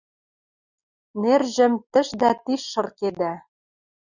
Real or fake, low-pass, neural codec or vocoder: real; 7.2 kHz; none